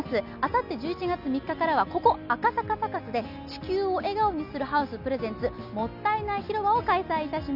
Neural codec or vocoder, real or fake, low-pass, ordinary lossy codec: none; real; 5.4 kHz; none